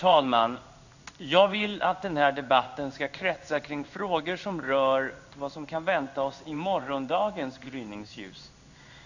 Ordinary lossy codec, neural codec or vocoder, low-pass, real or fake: none; codec, 16 kHz in and 24 kHz out, 1 kbps, XY-Tokenizer; 7.2 kHz; fake